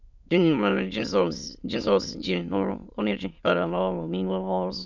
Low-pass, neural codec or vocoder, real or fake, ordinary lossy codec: 7.2 kHz; autoencoder, 22.05 kHz, a latent of 192 numbers a frame, VITS, trained on many speakers; fake; none